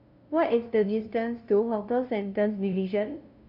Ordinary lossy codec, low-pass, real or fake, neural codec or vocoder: AAC, 48 kbps; 5.4 kHz; fake; codec, 16 kHz, 0.5 kbps, FunCodec, trained on LibriTTS, 25 frames a second